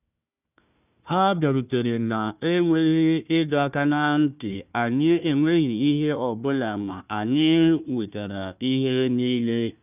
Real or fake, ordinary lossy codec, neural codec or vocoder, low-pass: fake; none; codec, 16 kHz, 1 kbps, FunCodec, trained on Chinese and English, 50 frames a second; 3.6 kHz